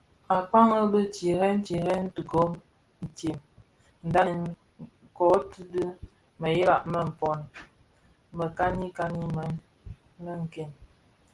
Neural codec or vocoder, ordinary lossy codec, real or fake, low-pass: none; Opus, 24 kbps; real; 10.8 kHz